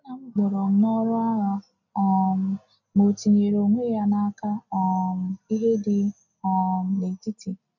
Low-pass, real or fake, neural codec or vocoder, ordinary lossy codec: 7.2 kHz; real; none; none